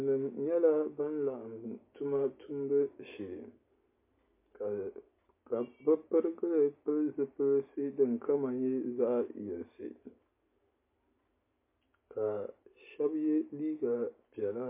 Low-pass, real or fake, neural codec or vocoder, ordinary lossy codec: 3.6 kHz; real; none; MP3, 24 kbps